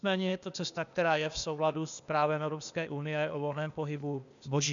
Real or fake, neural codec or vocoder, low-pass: fake; codec, 16 kHz, 0.8 kbps, ZipCodec; 7.2 kHz